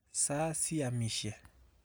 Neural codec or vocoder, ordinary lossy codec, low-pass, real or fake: none; none; none; real